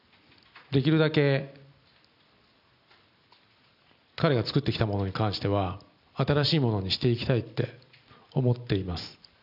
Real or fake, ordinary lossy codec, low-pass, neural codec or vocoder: real; none; 5.4 kHz; none